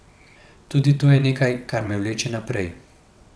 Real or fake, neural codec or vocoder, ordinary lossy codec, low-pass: fake; vocoder, 22.05 kHz, 80 mel bands, WaveNeXt; none; none